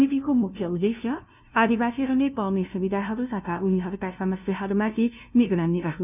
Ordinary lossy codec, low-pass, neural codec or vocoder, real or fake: none; 3.6 kHz; codec, 16 kHz, 0.5 kbps, FunCodec, trained on LibriTTS, 25 frames a second; fake